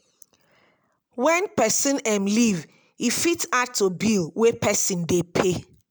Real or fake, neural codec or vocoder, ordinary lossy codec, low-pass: real; none; none; none